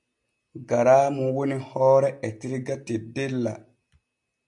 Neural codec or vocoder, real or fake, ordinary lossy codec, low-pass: none; real; AAC, 64 kbps; 10.8 kHz